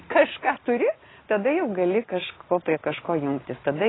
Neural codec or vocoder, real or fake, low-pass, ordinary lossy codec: none; real; 7.2 kHz; AAC, 16 kbps